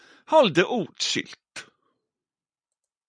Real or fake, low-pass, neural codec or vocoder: fake; 9.9 kHz; vocoder, 44.1 kHz, 128 mel bands every 256 samples, BigVGAN v2